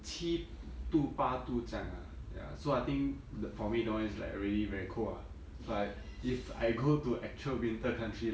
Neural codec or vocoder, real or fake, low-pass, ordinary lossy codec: none; real; none; none